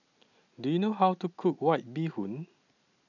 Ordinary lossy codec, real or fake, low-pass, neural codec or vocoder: none; real; 7.2 kHz; none